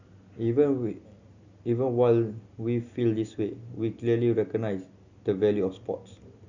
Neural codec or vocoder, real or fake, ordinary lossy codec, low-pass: none; real; Opus, 64 kbps; 7.2 kHz